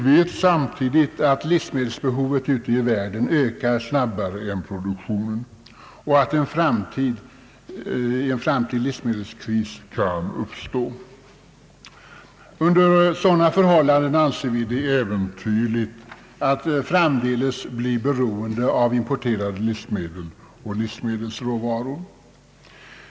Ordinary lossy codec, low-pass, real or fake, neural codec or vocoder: none; none; real; none